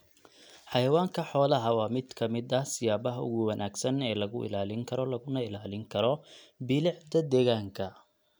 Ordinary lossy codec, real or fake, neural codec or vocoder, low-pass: none; real; none; none